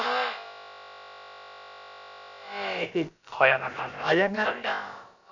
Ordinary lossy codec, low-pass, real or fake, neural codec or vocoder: none; 7.2 kHz; fake; codec, 16 kHz, about 1 kbps, DyCAST, with the encoder's durations